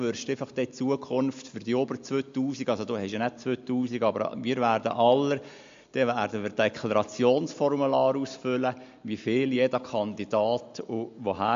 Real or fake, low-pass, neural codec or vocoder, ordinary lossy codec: real; 7.2 kHz; none; MP3, 48 kbps